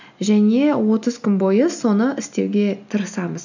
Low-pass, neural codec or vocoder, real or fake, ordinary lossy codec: 7.2 kHz; none; real; none